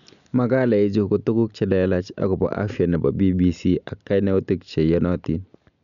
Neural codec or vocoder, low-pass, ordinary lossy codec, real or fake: none; 7.2 kHz; none; real